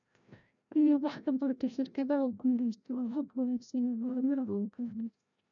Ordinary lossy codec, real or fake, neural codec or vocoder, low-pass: none; fake; codec, 16 kHz, 0.5 kbps, FreqCodec, larger model; 7.2 kHz